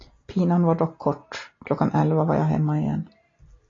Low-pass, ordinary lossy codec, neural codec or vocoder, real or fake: 7.2 kHz; AAC, 32 kbps; none; real